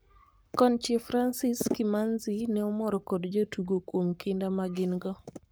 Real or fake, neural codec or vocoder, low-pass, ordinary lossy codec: fake; codec, 44.1 kHz, 7.8 kbps, Pupu-Codec; none; none